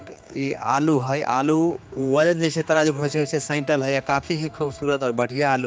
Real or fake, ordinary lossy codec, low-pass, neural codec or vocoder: fake; none; none; codec, 16 kHz, 2 kbps, X-Codec, HuBERT features, trained on general audio